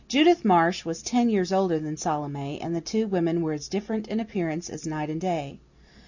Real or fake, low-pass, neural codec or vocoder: real; 7.2 kHz; none